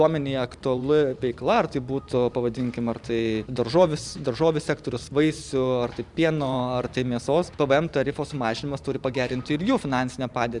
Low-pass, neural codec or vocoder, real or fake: 10.8 kHz; vocoder, 44.1 kHz, 128 mel bands every 512 samples, BigVGAN v2; fake